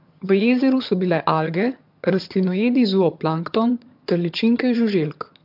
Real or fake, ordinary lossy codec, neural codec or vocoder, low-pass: fake; MP3, 48 kbps; vocoder, 22.05 kHz, 80 mel bands, HiFi-GAN; 5.4 kHz